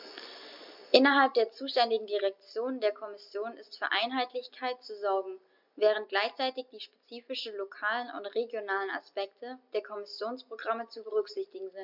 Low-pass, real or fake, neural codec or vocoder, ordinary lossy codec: 5.4 kHz; real; none; MP3, 48 kbps